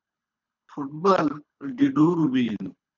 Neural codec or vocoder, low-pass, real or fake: codec, 24 kHz, 3 kbps, HILCodec; 7.2 kHz; fake